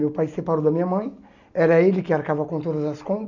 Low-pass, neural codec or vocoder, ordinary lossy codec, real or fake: 7.2 kHz; none; none; real